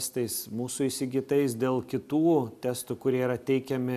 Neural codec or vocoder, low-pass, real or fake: none; 14.4 kHz; real